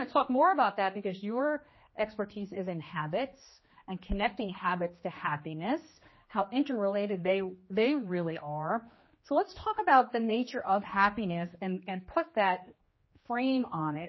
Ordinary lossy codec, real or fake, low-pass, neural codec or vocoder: MP3, 24 kbps; fake; 7.2 kHz; codec, 16 kHz, 2 kbps, X-Codec, HuBERT features, trained on general audio